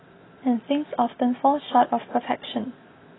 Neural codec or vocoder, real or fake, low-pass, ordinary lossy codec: none; real; 7.2 kHz; AAC, 16 kbps